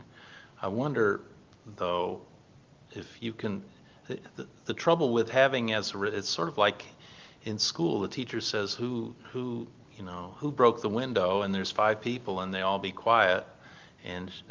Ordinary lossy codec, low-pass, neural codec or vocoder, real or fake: Opus, 24 kbps; 7.2 kHz; none; real